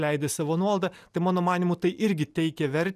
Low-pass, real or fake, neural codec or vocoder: 14.4 kHz; real; none